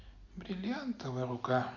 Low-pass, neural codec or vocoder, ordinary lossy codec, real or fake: 7.2 kHz; none; none; real